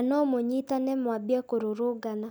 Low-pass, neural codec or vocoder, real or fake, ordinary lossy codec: none; none; real; none